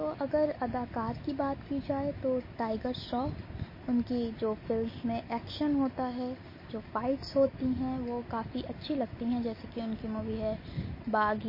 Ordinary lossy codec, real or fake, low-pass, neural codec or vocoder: MP3, 24 kbps; real; 5.4 kHz; none